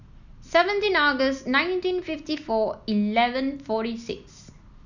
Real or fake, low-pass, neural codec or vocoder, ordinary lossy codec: real; 7.2 kHz; none; none